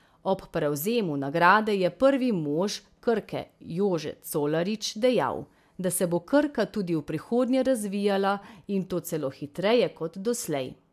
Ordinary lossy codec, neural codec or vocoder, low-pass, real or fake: AAC, 96 kbps; none; 14.4 kHz; real